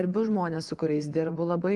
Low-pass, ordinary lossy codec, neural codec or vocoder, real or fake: 10.8 kHz; Opus, 24 kbps; vocoder, 24 kHz, 100 mel bands, Vocos; fake